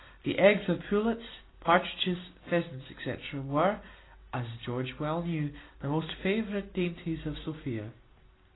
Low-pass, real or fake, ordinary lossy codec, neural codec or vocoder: 7.2 kHz; real; AAC, 16 kbps; none